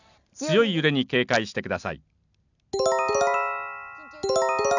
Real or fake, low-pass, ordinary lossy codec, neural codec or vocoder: real; 7.2 kHz; none; none